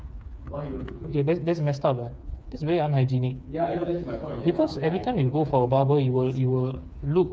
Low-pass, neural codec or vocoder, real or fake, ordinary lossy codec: none; codec, 16 kHz, 4 kbps, FreqCodec, smaller model; fake; none